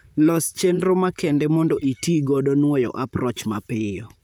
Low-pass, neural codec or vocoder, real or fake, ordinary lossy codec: none; vocoder, 44.1 kHz, 128 mel bands, Pupu-Vocoder; fake; none